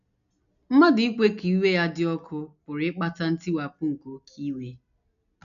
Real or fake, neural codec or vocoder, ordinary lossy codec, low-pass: real; none; none; 7.2 kHz